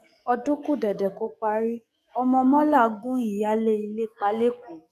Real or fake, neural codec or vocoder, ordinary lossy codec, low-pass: fake; codec, 44.1 kHz, 7.8 kbps, DAC; none; 14.4 kHz